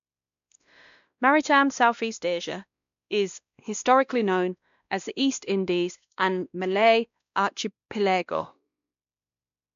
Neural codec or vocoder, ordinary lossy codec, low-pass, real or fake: codec, 16 kHz, 1 kbps, X-Codec, WavLM features, trained on Multilingual LibriSpeech; MP3, 64 kbps; 7.2 kHz; fake